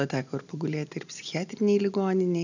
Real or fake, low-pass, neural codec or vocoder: real; 7.2 kHz; none